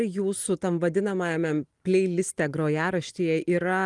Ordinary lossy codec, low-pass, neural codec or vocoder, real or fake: Opus, 24 kbps; 10.8 kHz; none; real